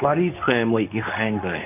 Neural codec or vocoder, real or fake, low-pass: codec, 24 kHz, 0.9 kbps, WavTokenizer, medium speech release version 2; fake; 3.6 kHz